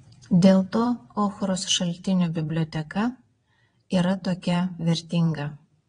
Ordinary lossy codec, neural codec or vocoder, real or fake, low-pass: AAC, 32 kbps; vocoder, 22.05 kHz, 80 mel bands, WaveNeXt; fake; 9.9 kHz